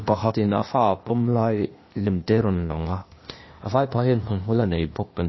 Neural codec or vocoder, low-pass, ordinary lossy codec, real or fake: codec, 16 kHz, 0.8 kbps, ZipCodec; 7.2 kHz; MP3, 24 kbps; fake